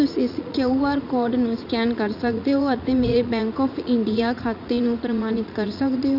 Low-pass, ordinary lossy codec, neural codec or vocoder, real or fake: 5.4 kHz; none; vocoder, 44.1 kHz, 80 mel bands, Vocos; fake